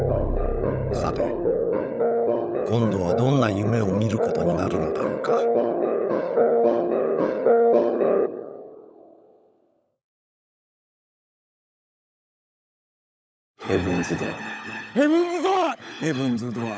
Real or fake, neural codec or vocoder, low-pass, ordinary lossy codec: fake; codec, 16 kHz, 16 kbps, FunCodec, trained on LibriTTS, 50 frames a second; none; none